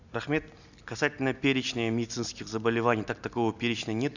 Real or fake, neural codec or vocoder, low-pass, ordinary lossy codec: real; none; 7.2 kHz; none